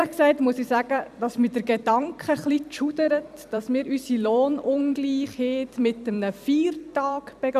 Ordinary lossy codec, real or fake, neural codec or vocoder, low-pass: none; fake; vocoder, 44.1 kHz, 128 mel bands every 256 samples, BigVGAN v2; 14.4 kHz